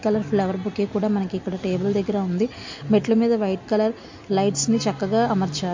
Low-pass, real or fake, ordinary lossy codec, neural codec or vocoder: 7.2 kHz; real; MP3, 48 kbps; none